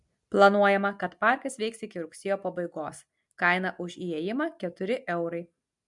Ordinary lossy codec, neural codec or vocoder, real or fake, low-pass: MP3, 64 kbps; none; real; 10.8 kHz